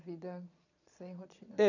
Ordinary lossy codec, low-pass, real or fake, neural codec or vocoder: none; 7.2 kHz; fake; vocoder, 22.05 kHz, 80 mel bands, Vocos